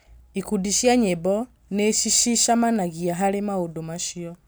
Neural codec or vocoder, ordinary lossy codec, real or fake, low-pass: none; none; real; none